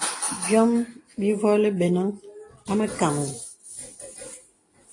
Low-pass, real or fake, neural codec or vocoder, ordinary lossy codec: 10.8 kHz; real; none; AAC, 32 kbps